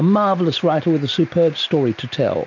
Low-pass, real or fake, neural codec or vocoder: 7.2 kHz; real; none